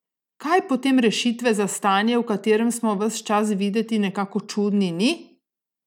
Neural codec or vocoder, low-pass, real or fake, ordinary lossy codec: none; 19.8 kHz; real; none